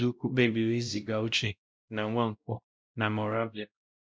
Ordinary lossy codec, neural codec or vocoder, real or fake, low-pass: none; codec, 16 kHz, 0.5 kbps, X-Codec, WavLM features, trained on Multilingual LibriSpeech; fake; none